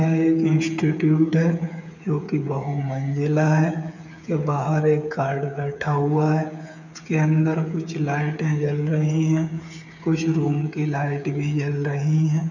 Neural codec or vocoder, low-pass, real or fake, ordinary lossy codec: codec, 16 kHz, 8 kbps, FreqCodec, smaller model; 7.2 kHz; fake; none